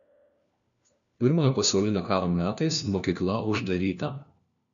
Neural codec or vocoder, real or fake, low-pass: codec, 16 kHz, 1 kbps, FunCodec, trained on LibriTTS, 50 frames a second; fake; 7.2 kHz